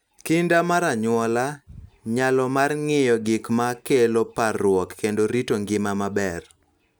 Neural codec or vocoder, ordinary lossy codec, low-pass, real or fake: none; none; none; real